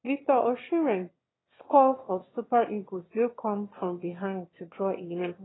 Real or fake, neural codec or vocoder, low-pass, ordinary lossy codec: fake; autoencoder, 22.05 kHz, a latent of 192 numbers a frame, VITS, trained on one speaker; 7.2 kHz; AAC, 16 kbps